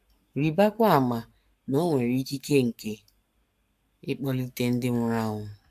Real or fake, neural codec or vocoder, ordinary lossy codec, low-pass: fake; codec, 44.1 kHz, 7.8 kbps, Pupu-Codec; AAC, 96 kbps; 14.4 kHz